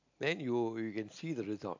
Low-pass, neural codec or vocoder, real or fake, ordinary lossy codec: 7.2 kHz; none; real; AAC, 48 kbps